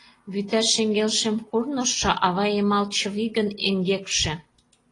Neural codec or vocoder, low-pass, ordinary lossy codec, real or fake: none; 10.8 kHz; AAC, 32 kbps; real